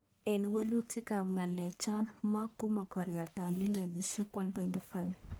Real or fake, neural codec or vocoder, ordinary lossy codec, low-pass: fake; codec, 44.1 kHz, 1.7 kbps, Pupu-Codec; none; none